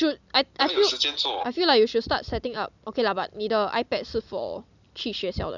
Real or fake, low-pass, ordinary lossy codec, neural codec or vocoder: real; 7.2 kHz; none; none